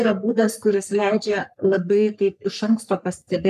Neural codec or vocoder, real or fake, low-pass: codec, 44.1 kHz, 3.4 kbps, Pupu-Codec; fake; 14.4 kHz